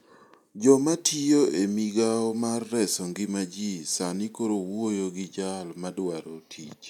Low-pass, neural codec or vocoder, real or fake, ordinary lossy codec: 19.8 kHz; none; real; none